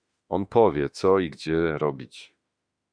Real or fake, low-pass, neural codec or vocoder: fake; 9.9 kHz; autoencoder, 48 kHz, 32 numbers a frame, DAC-VAE, trained on Japanese speech